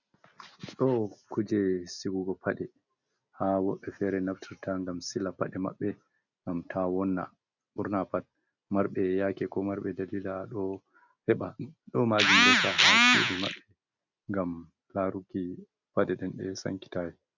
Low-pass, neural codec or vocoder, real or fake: 7.2 kHz; none; real